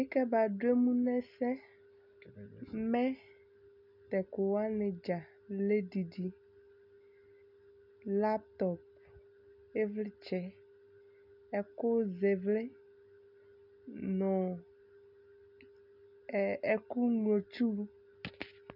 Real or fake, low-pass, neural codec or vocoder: real; 5.4 kHz; none